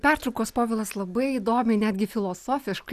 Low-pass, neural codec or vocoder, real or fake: 14.4 kHz; none; real